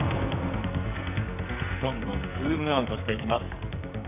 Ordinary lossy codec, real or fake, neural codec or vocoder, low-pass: none; fake; codec, 44.1 kHz, 2.6 kbps, SNAC; 3.6 kHz